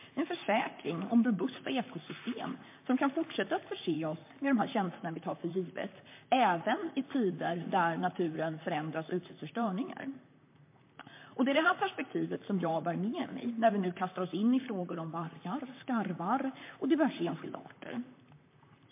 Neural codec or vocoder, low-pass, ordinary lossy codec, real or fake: vocoder, 44.1 kHz, 128 mel bands, Pupu-Vocoder; 3.6 kHz; MP3, 24 kbps; fake